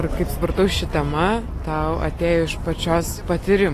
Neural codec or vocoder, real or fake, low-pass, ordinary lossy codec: none; real; 14.4 kHz; AAC, 48 kbps